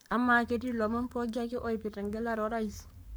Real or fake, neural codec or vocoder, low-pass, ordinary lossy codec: fake; codec, 44.1 kHz, 7.8 kbps, Pupu-Codec; none; none